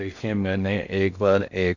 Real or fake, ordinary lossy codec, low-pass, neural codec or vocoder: fake; none; 7.2 kHz; codec, 16 kHz in and 24 kHz out, 0.6 kbps, FocalCodec, streaming, 2048 codes